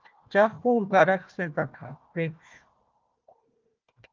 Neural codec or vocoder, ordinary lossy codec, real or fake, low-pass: codec, 16 kHz, 1 kbps, FunCodec, trained on Chinese and English, 50 frames a second; Opus, 32 kbps; fake; 7.2 kHz